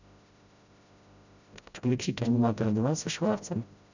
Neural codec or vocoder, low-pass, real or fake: codec, 16 kHz, 0.5 kbps, FreqCodec, smaller model; 7.2 kHz; fake